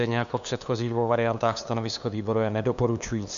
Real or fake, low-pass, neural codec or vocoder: fake; 7.2 kHz; codec, 16 kHz, 2 kbps, FunCodec, trained on LibriTTS, 25 frames a second